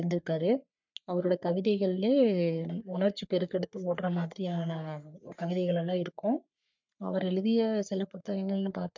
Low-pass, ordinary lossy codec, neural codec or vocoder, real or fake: 7.2 kHz; MP3, 64 kbps; codec, 44.1 kHz, 3.4 kbps, Pupu-Codec; fake